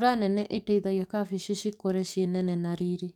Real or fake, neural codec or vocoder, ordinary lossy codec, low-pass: fake; codec, 44.1 kHz, 7.8 kbps, DAC; none; 19.8 kHz